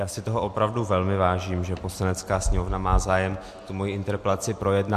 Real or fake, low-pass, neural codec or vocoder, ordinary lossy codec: real; 14.4 kHz; none; MP3, 64 kbps